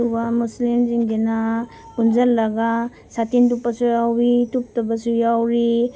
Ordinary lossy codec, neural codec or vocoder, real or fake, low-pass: none; none; real; none